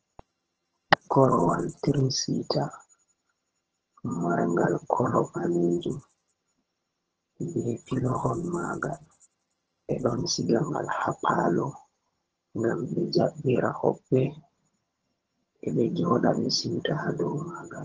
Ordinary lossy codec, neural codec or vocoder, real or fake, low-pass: Opus, 16 kbps; vocoder, 22.05 kHz, 80 mel bands, HiFi-GAN; fake; 7.2 kHz